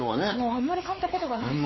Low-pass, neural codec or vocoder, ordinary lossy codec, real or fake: 7.2 kHz; codec, 16 kHz, 4 kbps, X-Codec, WavLM features, trained on Multilingual LibriSpeech; MP3, 24 kbps; fake